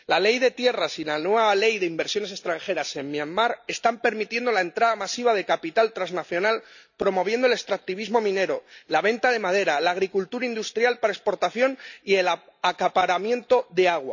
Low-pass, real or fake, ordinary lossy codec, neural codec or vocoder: 7.2 kHz; real; none; none